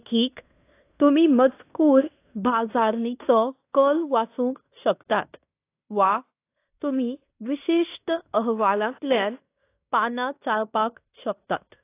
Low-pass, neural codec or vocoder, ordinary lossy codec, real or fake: 3.6 kHz; codec, 16 kHz in and 24 kHz out, 0.9 kbps, LongCat-Audio-Codec, fine tuned four codebook decoder; AAC, 24 kbps; fake